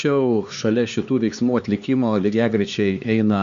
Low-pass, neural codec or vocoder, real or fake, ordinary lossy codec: 7.2 kHz; codec, 16 kHz, 2 kbps, X-Codec, HuBERT features, trained on LibriSpeech; fake; Opus, 64 kbps